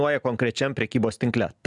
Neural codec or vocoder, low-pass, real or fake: none; 10.8 kHz; real